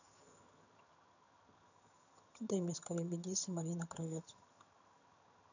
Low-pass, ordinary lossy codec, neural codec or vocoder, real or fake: 7.2 kHz; none; vocoder, 22.05 kHz, 80 mel bands, HiFi-GAN; fake